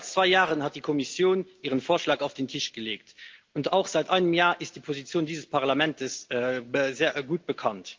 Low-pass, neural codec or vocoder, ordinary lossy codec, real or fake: 7.2 kHz; none; Opus, 24 kbps; real